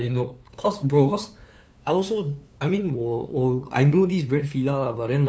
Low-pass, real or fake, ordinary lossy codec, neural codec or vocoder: none; fake; none; codec, 16 kHz, 2 kbps, FunCodec, trained on LibriTTS, 25 frames a second